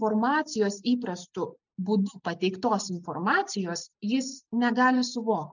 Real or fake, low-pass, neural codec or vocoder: real; 7.2 kHz; none